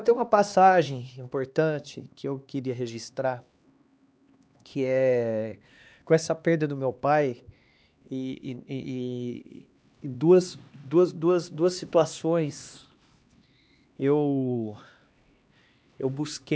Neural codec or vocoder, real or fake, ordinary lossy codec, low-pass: codec, 16 kHz, 2 kbps, X-Codec, HuBERT features, trained on LibriSpeech; fake; none; none